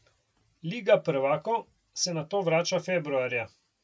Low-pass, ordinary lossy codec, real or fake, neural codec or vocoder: none; none; real; none